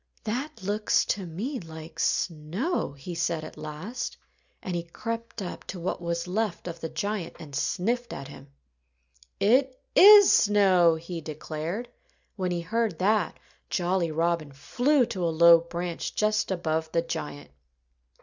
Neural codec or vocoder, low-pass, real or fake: none; 7.2 kHz; real